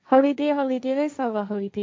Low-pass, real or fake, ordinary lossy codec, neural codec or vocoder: none; fake; none; codec, 16 kHz, 1.1 kbps, Voila-Tokenizer